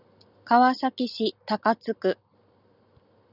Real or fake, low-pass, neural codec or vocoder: real; 5.4 kHz; none